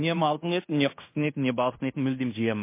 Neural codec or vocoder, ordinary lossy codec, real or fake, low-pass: codec, 16 kHz in and 24 kHz out, 0.9 kbps, LongCat-Audio-Codec, fine tuned four codebook decoder; MP3, 24 kbps; fake; 3.6 kHz